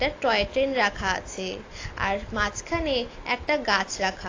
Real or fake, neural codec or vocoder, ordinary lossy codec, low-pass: real; none; AAC, 32 kbps; 7.2 kHz